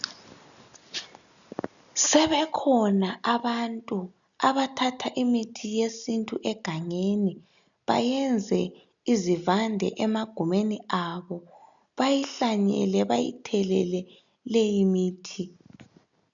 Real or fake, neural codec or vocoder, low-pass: real; none; 7.2 kHz